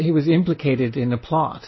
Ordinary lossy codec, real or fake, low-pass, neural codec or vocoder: MP3, 24 kbps; real; 7.2 kHz; none